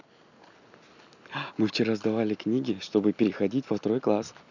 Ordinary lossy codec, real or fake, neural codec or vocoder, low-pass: none; real; none; 7.2 kHz